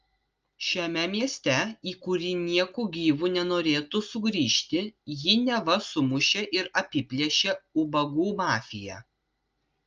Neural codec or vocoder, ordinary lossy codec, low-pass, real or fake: none; Opus, 24 kbps; 7.2 kHz; real